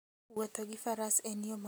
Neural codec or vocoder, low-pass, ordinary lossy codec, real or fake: none; none; none; real